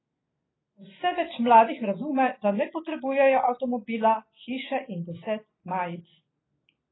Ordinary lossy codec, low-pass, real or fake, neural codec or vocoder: AAC, 16 kbps; 7.2 kHz; real; none